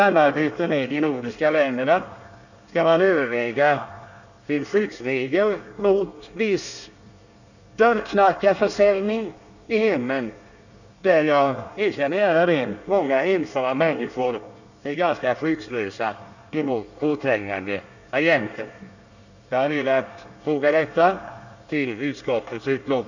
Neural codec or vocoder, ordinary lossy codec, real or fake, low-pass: codec, 24 kHz, 1 kbps, SNAC; none; fake; 7.2 kHz